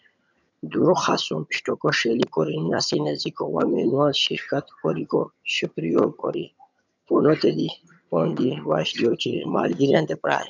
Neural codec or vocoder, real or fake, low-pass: vocoder, 22.05 kHz, 80 mel bands, HiFi-GAN; fake; 7.2 kHz